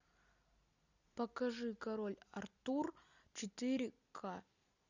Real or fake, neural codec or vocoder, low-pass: real; none; 7.2 kHz